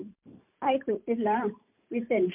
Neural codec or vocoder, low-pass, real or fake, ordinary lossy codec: vocoder, 44.1 kHz, 128 mel bands every 512 samples, BigVGAN v2; 3.6 kHz; fake; MP3, 32 kbps